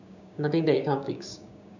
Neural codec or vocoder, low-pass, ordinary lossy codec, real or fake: codec, 16 kHz, 6 kbps, DAC; 7.2 kHz; none; fake